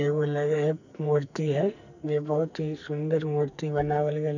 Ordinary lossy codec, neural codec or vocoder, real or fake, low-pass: none; codec, 44.1 kHz, 2.6 kbps, SNAC; fake; 7.2 kHz